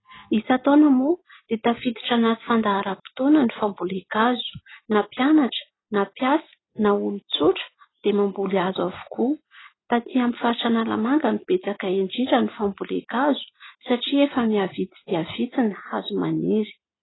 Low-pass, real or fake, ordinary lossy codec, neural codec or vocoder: 7.2 kHz; real; AAC, 16 kbps; none